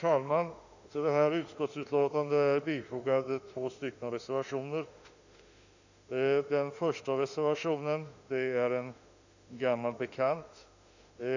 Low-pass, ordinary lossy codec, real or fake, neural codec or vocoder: 7.2 kHz; none; fake; autoencoder, 48 kHz, 32 numbers a frame, DAC-VAE, trained on Japanese speech